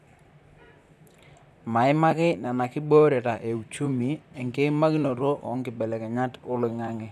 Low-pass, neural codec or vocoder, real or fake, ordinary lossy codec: 14.4 kHz; vocoder, 44.1 kHz, 128 mel bands, Pupu-Vocoder; fake; none